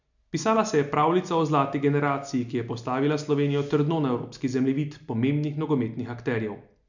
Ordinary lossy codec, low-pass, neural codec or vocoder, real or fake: none; 7.2 kHz; none; real